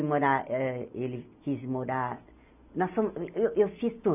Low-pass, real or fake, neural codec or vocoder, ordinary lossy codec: 3.6 kHz; real; none; none